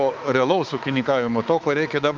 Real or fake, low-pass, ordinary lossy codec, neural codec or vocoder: fake; 7.2 kHz; Opus, 32 kbps; codec, 16 kHz, 4 kbps, X-Codec, HuBERT features, trained on balanced general audio